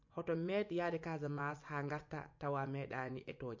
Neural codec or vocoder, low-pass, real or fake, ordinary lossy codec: vocoder, 24 kHz, 100 mel bands, Vocos; 7.2 kHz; fake; MP3, 48 kbps